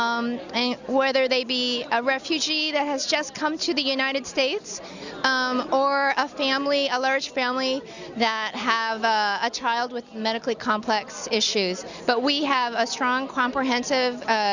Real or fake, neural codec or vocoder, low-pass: real; none; 7.2 kHz